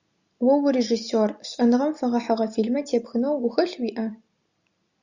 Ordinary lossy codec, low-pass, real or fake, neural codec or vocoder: Opus, 64 kbps; 7.2 kHz; real; none